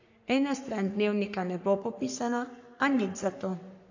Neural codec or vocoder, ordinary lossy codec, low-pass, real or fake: codec, 44.1 kHz, 3.4 kbps, Pupu-Codec; AAC, 48 kbps; 7.2 kHz; fake